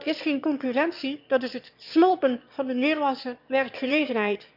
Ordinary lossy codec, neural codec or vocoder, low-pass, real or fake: none; autoencoder, 22.05 kHz, a latent of 192 numbers a frame, VITS, trained on one speaker; 5.4 kHz; fake